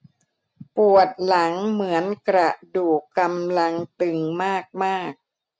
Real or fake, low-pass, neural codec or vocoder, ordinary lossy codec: real; none; none; none